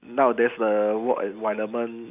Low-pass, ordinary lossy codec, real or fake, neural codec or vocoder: 3.6 kHz; none; real; none